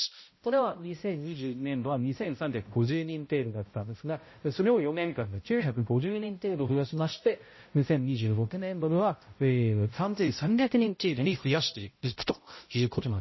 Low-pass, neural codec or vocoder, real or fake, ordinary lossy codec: 7.2 kHz; codec, 16 kHz, 0.5 kbps, X-Codec, HuBERT features, trained on balanced general audio; fake; MP3, 24 kbps